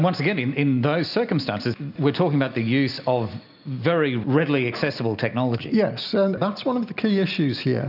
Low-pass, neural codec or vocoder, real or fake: 5.4 kHz; none; real